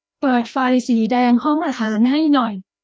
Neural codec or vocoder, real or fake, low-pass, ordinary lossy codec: codec, 16 kHz, 1 kbps, FreqCodec, larger model; fake; none; none